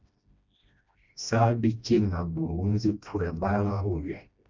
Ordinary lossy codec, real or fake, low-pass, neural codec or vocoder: MP3, 64 kbps; fake; 7.2 kHz; codec, 16 kHz, 1 kbps, FreqCodec, smaller model